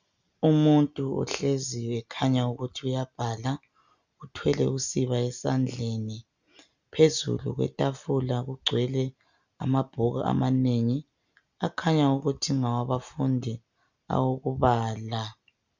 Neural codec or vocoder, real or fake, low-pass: none; real; 7.2 kHz